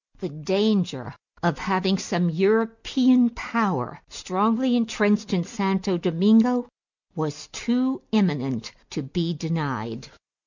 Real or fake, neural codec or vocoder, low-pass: real; none; 7.2 kHz